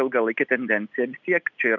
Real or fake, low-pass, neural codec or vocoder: real; 7.2 kHz; none